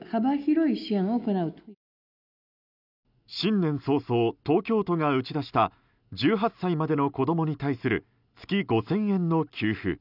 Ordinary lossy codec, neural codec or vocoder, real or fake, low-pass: none; none; real; 5.4 kHz